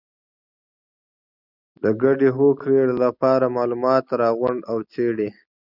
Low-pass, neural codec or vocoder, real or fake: 5.4 kHz; none; real